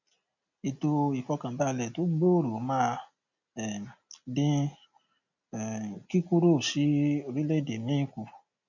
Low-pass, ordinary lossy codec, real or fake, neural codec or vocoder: 7.2 kHz; none; real; none